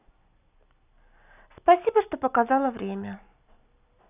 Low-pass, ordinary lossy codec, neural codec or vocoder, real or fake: 3.6 kHz; none; none; real